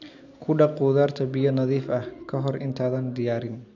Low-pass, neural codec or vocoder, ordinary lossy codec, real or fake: 7.2 kHz; none; none; real